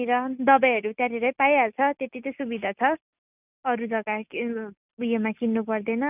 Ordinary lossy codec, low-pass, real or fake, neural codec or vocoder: none; 3.6 kHz; real; none